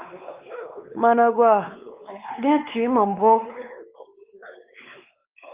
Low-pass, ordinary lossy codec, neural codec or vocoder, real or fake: 3.6 kHz; Opus, 24 kbps; codec, 16 kHz, 2 kbps, X-Codec, WavLM features, trained on Multilingual LibriSpeech; fake